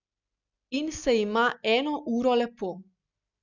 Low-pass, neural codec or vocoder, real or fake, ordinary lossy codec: 7.2 kHz; none; real; none